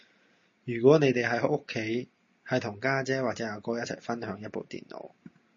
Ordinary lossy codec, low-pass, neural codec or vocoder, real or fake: MP3, 32 kbps; 7.2 kHz; none; real